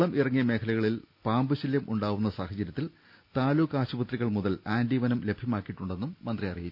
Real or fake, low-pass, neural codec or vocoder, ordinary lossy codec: real; 5.4 kHz; none; none